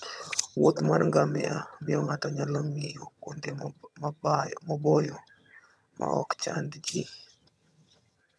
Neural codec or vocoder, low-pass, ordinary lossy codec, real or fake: vocoder, 22.05 kHz, 80 mel bands, HiFi-GAN; none; none; fake